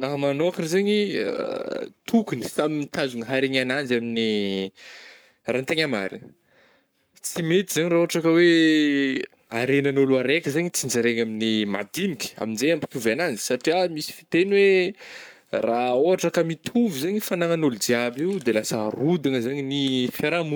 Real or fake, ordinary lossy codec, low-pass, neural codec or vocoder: fake; none; none; codec, 44.1 kHz, 7.8 kbps, Pupu-Codec